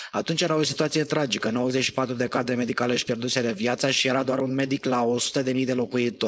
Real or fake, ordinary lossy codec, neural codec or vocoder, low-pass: fake; none; codec, 16 kHz, 4.8 kbps, FACodec; none